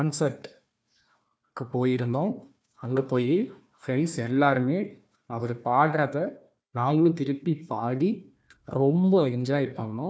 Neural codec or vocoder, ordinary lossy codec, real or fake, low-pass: codec, 16 kHz, 1 kbps, FunCodec, trained on Chinese and English, 50 frames a second; none; fake; none